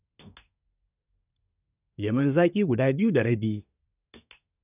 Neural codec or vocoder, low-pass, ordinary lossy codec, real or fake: codec, 24 kHz, 1 kbps, SNAC; 3.6 kHz; none; fake